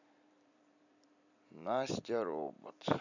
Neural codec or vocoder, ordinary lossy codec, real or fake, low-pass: vocoder, 44.1 kHz, 128 mel bands every 256 samples, BigVGAN v2; none; fake; 7.2 kHz